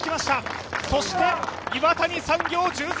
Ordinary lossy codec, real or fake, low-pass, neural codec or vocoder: none; real; none; none